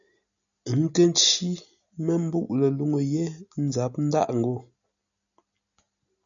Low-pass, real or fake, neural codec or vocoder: 7.2 kHz; real; none